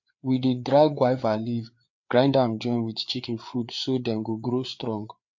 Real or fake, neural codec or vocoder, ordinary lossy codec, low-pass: fake; codec, 16 kHz, 4 kbps, FreqCodec, larger model; MP3, 48 kbps; 7.2 kHz